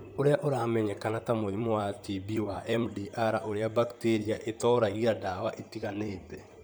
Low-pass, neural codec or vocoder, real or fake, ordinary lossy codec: none; vocoder, 44.1 kHz, 128 mel bands, Pupu-Vocoder; fake; none